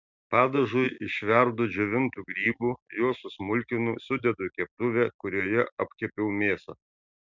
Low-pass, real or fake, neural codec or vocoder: 7.2 kHz; real; none